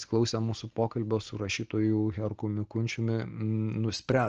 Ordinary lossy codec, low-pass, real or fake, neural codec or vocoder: Opus, 16 kbps; 7.2 kHz; real; none